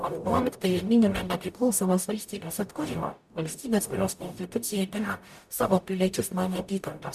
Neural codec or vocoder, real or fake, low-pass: codec, 44.1 kHz, 0.9 kbps, DAC; fake; 14.4 kHz